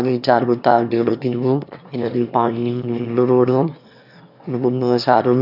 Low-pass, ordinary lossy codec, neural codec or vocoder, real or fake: 5.4 kHz; none; autoencoder, 22.05 kHz, a latent of 192 numbers a frame, VITS, trained on one speaker; fake